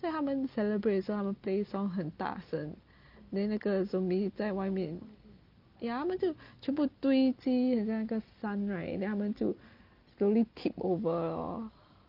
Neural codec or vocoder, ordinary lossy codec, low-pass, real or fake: none; Opus, 24 kbps; 5.4 kHz; real